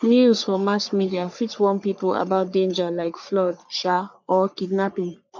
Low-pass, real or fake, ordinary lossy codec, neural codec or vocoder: 7.2 kHz; fake; none; codec, 44.1 kHz, 3.4 kbps, Pupu-Codec